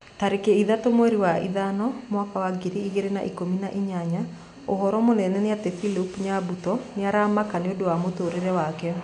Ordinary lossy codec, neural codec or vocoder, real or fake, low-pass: none; none; real; 9.9 kHz